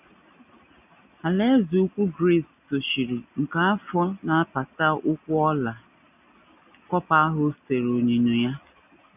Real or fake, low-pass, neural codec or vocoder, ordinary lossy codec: real; 3.6 kHz; none; none